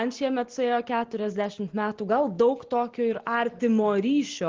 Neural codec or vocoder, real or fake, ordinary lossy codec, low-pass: none; real; Opus, 16 kbps; 7.2 kHz